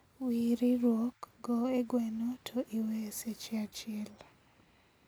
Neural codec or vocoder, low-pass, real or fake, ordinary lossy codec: none; none; real; none